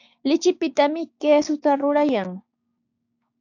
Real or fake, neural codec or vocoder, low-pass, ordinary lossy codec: fake; codec, 16 kHz, 6 kbps, DAC; 7.2 kHz; AAC, 48 kbps